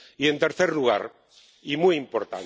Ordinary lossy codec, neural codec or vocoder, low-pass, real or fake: none; none; none; real